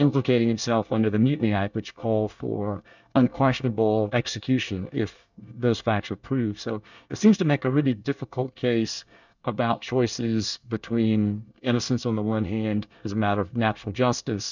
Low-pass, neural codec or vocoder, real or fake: 7.2 kHz; codec, 24 kHz, 1 kbps, SNAC; fake